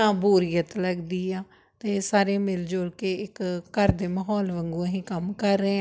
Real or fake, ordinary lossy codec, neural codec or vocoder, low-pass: real; none; none; none